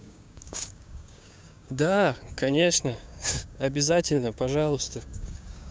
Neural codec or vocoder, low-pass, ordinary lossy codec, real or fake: codec, 16 kHz, 6 kbps, DAC; none; none; fake